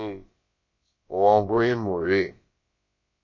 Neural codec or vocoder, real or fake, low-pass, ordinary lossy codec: codec, 16 kHz, about 1 kbps, DyCAST, with the encoder's durations; fake; 7.2 kHz; MP3, 32 kbps